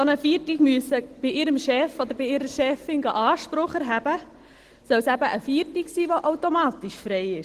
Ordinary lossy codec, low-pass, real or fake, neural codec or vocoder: Opus, 16 kbps; 14.4 kHz; real; none